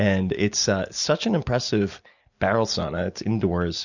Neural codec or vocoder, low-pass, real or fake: none; 7.2 kHz; real